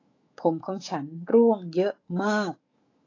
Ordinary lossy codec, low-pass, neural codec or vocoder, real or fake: AAC, 32 kbps; 7.2 kHz; autoencoder, 48 kHz, 128 numbers a frame, DAC-VAE, trained on Japanese speech; fake